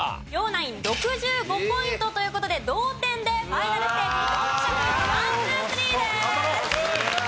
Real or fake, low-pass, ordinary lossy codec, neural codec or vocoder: real; none; none; none